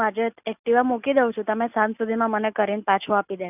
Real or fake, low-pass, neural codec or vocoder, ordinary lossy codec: real; 3.6 kHz; none; none